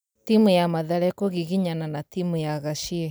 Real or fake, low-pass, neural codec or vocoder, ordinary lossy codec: real; none; none; none